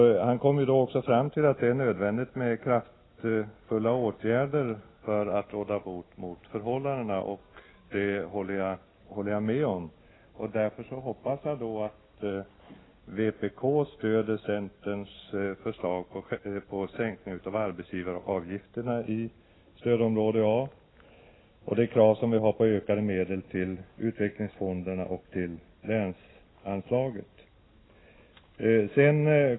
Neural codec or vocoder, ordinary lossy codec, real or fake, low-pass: none; AAC, 16 kbps; real; 7.2 kHz